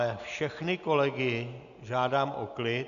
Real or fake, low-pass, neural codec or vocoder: real; 7.2 kHz; none